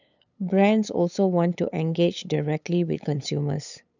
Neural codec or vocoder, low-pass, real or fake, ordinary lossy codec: codec, 16 kHz, 8 kbps, FunCodec, trained on LibriTTS, 25 frames a second; 7.2 kHz; fake; none